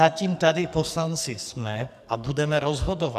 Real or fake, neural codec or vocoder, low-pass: fake; codec, 44.1 kHz, 2.6 kbps, SNAC; 14.4 kHz